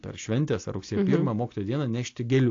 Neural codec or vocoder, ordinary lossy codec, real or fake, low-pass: none; AAC, 48 kbps; real; 7.2 kHz